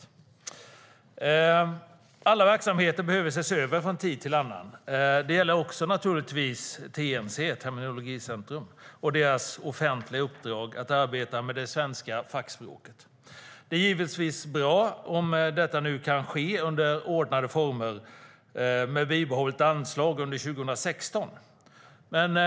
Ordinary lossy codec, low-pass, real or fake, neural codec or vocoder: none; none; real; none